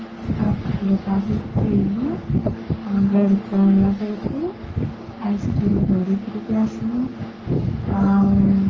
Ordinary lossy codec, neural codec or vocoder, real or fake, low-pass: Opus, 24 kbps; codec, 44.1 kHz, 3.4 kbps, Pupu-Codec; fake; 7.2 kHz